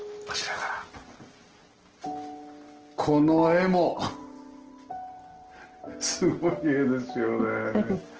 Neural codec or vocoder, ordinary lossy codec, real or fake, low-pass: none; Opus, 16 kbps; real; 7.2 kHz